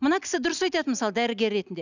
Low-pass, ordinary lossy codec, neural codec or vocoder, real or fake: 7.2 kHz; none; none; real